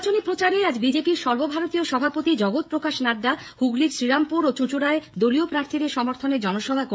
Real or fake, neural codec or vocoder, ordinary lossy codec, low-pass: fake; codec, 16 kHz, 16 kbps, FreqCodec, smaller model; none; none